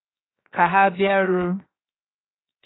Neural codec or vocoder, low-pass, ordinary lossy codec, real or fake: codec, 16 kHz, 0.7 kbps, FocalCodec; 7.2 kHz; AAC, 16 kbps; fake